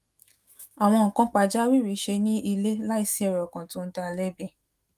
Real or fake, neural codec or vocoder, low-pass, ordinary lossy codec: fake; autoencoder, 48 kHz, 128 numbers a frame, DAC-VAE, trained on Japanese speech; 14.4 kHz; Opus, 32 kbps